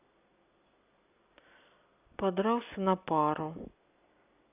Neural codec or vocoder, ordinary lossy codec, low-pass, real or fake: none; none; 3.6 kHz; real